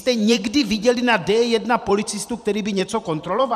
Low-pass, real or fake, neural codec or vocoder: 14.4 kHz; fake; vocoder, 44.1 kHz, 128 mel bands every 512 samples, BigVGAN v2